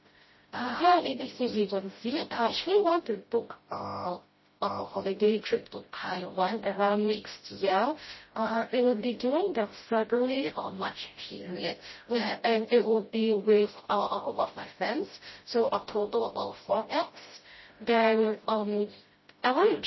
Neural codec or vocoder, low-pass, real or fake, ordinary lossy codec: codec, 16 kHz, 0.5 kbps, FreqCodec, smaller model; 7.2 kHz; fake; MP3, 24 kbps